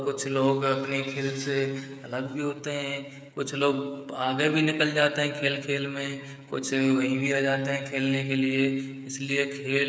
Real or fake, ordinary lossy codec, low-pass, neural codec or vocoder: fake; none; none; codec, 16 kHz, 8 kbps, FreqCodec, smaller model